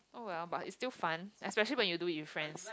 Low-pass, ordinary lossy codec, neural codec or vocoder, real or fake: none; none; none; real